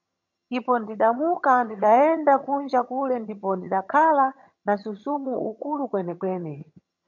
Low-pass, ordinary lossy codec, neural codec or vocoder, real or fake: 7.2 kHz; MP3, 48 kbps; vocoder, 22.05 kHz, 80 mel bands, HiFi-GAN; fake